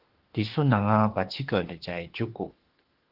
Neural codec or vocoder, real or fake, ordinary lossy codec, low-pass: autoencoder, 48 kHz, 32 numbers a frame, DAC-VAE, trained on Japanese speech; fake; Opus, 16 kbps; 5.4 kHz